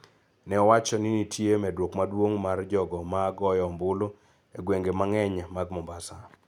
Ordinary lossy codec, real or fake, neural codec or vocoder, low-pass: none; real; none; 19.8 kHz